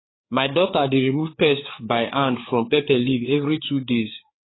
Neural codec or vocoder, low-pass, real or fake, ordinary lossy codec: codec, 16 kHz, 4 kbps, FreqCodec, larger model; 7.2 kHz; fake; AAC, 16 kbps